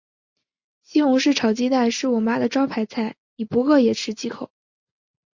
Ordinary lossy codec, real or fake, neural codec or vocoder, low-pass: MP3, 48 kbps; real; none; 7.2 kHz